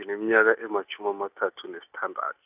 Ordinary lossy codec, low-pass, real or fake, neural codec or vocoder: none; 3.6 kHz; real; none